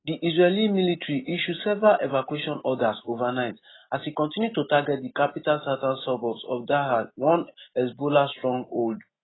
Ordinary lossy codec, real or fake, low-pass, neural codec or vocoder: AAC, 16 kbps; real; 7.2 kHz; none